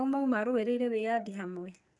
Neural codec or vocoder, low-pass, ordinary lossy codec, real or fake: codec, 44.1 kHz, 2.6 kbps, SNAC; 10.8 kHz; none; fake